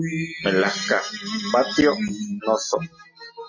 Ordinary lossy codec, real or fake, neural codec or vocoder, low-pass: MP3, 32 kbps; real; none; 7.2 kHz